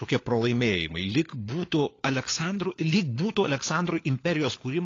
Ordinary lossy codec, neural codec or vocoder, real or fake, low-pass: AAC, 32 kbps; codec, 16 kHz, 4 kbps, FunCodec, trained on LibriTTS, 50 frames a second; fake; 7.2 kHz